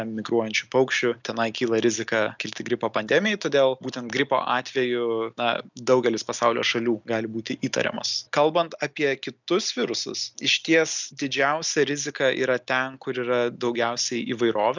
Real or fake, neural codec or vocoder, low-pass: real; none; 7.2 kHz